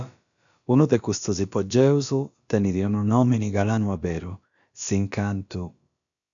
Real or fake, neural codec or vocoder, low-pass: fake; codec, 16 kHz, about 1 kbps, DyCAST, with the encoder's durations; 7.2 kHz